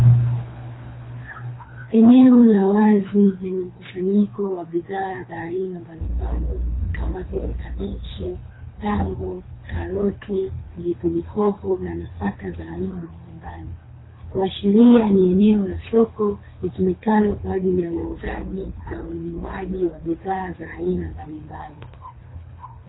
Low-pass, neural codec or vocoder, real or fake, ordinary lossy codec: 7.2 kHz; codec, 24 kHz, 3 kbps, HILCodec; fake; AAC, 16 kbps